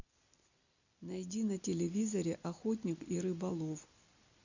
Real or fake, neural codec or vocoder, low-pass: real; none; 7.2 kHz